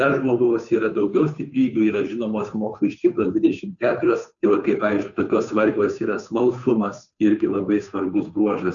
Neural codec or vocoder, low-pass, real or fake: codec, 16 kHz, 2 kbps, FunCodec, trained on Chinese and English, 25 frames a second; 7.2 kHz; fake